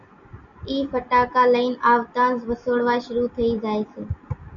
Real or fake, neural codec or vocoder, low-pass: real; none; 7.2 kHz